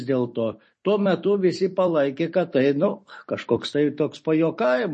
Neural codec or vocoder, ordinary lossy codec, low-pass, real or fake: vocoder, 44.1 kHz, 128 mel bands every 256 samples, BigVGAN v2; MP3, 32 kbps; 10.8 kHz; fake